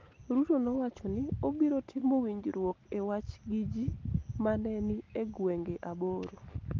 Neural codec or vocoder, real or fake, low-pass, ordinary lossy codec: none; real; 7.2 kHz; Opus, 32 kbps